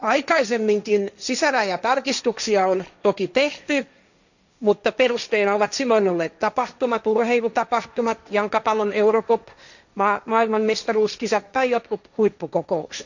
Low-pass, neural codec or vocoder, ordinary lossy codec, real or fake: 7.2 kHz; codec, 16 kHz, 1.1 kbps, Voila-Tokenizer; none; fake